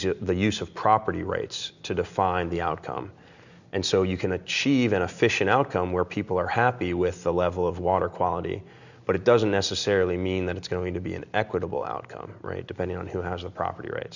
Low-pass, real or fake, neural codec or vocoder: 7.2 kHz; real; none